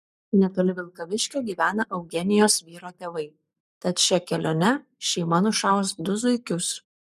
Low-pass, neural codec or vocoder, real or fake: 14.4 kHz; codec, 44.1 kHz, 7.8 kbps, Pupu-Codec; fake